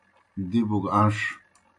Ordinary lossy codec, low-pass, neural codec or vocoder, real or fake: AAC, 64 kbps; 10.8 kHz; none; real